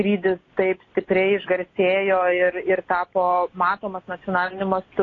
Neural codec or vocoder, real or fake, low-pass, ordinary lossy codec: none; real; 7.2 kHz; AAC, 32 kbps